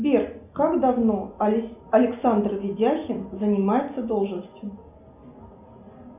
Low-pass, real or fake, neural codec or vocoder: 3.6 kHz; real; none